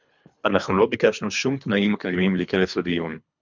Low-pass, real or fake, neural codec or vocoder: 7.2 kHz; fake; codec, 24 kHz, 3 kbps, HILCodec